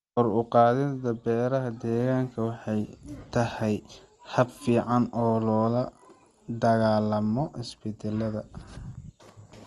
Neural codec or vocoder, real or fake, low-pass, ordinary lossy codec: none; real; 10.8 kHz; Opus, 24 kbps